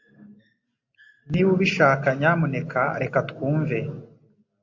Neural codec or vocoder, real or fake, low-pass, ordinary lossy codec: none; real; 7.2 kHz; AAC, 48 kbps